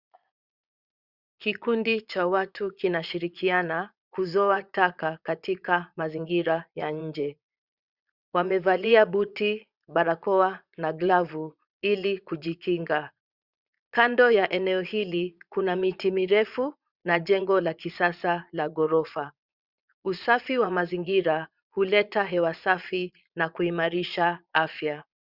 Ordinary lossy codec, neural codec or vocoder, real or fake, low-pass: Opus, 64 kbps; vocoder, 22.05 kHz, 80 mel bands, Vocos; fake; 5.4 kHz